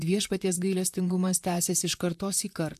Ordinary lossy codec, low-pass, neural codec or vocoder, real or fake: AAC, 96 kbps; 14.4 kHz; vocoder, 44.1 kHz, 128 mel bands, Pupu-Vocoder; fake